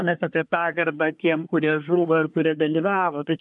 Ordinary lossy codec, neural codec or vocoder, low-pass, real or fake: MP3, 96 kbps; codec, 24 kHz, 1 kbps, SNAC; 10.8 kHz; fake